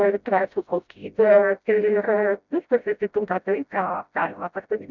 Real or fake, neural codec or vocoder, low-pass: fake; codec, 16 kHz, 0.5 kbps, FreqCodec, smaller model; 7.2 kHz